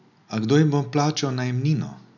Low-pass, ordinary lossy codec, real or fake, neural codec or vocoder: 7.2 kHz; none; real; none